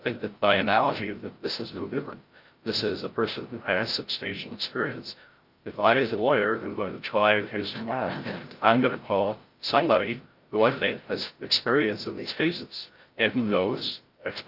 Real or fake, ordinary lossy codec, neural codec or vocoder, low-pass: fake; Opus, 32 kbps; codec, 16 kHz, 0.5 kbps, FreqCodec, larger model; 5.4 kHz